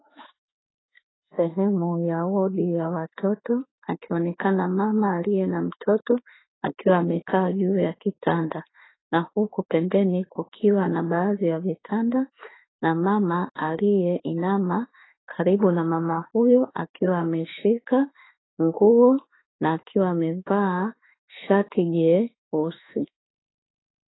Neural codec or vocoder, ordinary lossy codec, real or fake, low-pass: autoencoder, 48 kHz, 32 numbers a frame, DAC-VAE, trained on Japanese speech; AAC, 16 kbps; fake; 7.2 kHz